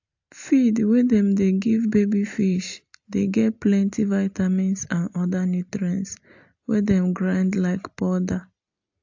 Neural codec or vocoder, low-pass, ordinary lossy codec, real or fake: none; 7.2 kHz; AAC, 48 kbps; real